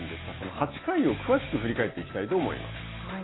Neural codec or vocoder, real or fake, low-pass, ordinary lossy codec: none; real; 7.2 kHz; AAC, 16 kbps